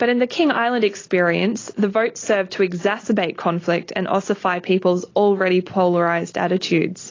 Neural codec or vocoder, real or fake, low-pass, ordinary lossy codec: none; real; 7.2 kHz; AAC, 32 kbps